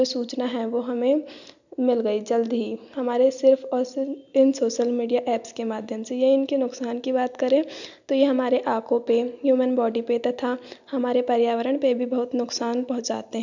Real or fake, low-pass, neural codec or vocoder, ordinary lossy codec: real; 7.2 kHz; none; none